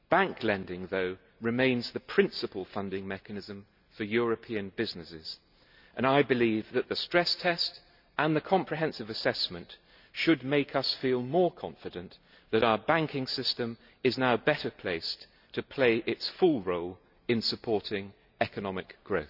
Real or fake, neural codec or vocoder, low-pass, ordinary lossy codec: real; none; 5.4 kHz; none